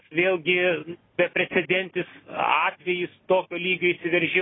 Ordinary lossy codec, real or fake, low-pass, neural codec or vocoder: AAC, 16 kbps; real; 7.2 kHz; none